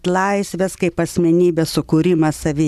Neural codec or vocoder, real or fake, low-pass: none; real; 14.4 kHz